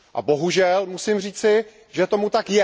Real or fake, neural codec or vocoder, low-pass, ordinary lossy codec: real; none; none; none